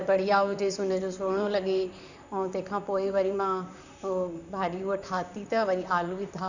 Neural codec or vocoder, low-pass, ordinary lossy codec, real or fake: vocoder, 44.1 kHz, 128 mel bands, Pupu-Vocoder; 7.2 kHz; none; fake